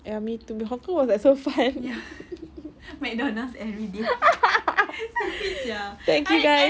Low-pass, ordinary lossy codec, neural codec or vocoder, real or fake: none; none; none; real